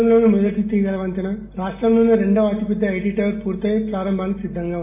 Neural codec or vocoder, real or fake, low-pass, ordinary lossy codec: none; real; 3.6 kHz; MP3, 24 kbps